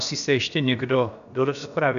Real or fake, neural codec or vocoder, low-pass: fake; codec, 16 kHz, about 1 kbps, DyCAST, with the encoder's durations; 7.2 kHz